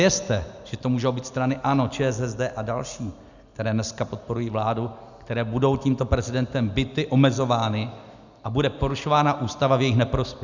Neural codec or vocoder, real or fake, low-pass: none; real; 7.2 kHz